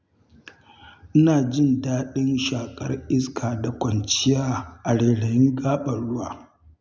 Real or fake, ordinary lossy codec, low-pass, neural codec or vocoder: real; none; none; none